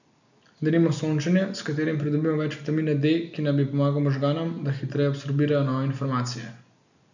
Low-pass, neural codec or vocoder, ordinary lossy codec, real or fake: 7.2 kHz; none; none; real